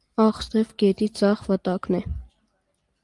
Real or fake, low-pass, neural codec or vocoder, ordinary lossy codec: real; 10.8 kHz; none; Opus, 32 kbps